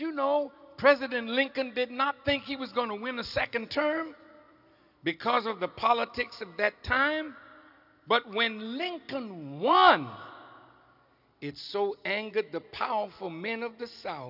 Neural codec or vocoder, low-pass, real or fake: none; 5.4 kHz; real